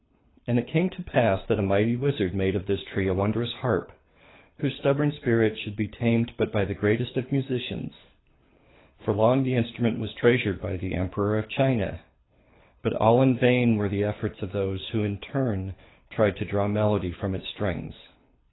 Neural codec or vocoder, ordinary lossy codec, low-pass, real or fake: codec, 24 kHz, 6 kbps, HILCodec; AAC, 16 kbps; 7.2 kHz; fake